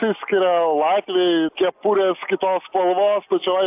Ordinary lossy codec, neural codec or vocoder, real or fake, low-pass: AAC, 32 kbps; none; real; 3.6 kHz